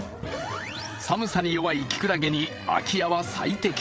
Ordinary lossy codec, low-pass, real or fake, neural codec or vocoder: none; none; fake; codec, 16 kHz, 8 kbps, FreqCodec, larger model